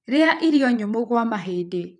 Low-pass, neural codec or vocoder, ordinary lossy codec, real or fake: 9.9 kHz; vocoder, 22.05 kHz, 80 mel bands, WaveNeXt; none; fake